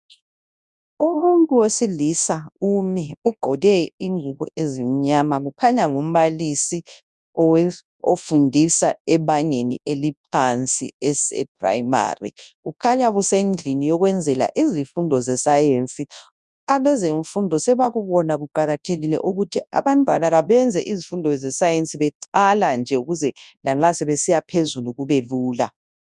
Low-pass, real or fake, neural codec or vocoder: 10.8 kHz; fake; codec, 24 kHz, 0.9 kbps, WavTokenizer, large speech release